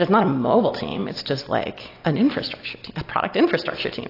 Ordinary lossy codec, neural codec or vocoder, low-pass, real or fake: AAC, 32 kbps; none; 5.4 kHz; real